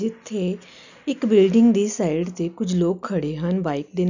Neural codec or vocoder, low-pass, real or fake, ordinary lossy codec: none; 7.2 kHz; real; none